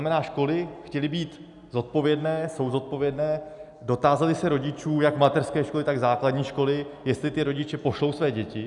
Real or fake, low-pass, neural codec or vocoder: real; 10.8 kHz; none